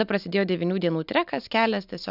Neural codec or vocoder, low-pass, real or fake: none; 5.4 kHz; real